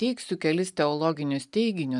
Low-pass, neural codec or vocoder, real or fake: 10.8 kHz; none; real